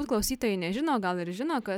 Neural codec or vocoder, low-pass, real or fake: none; 19.8 kHz; real